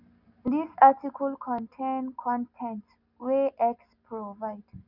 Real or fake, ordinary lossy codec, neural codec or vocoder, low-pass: real; none; none; 5.4 kHz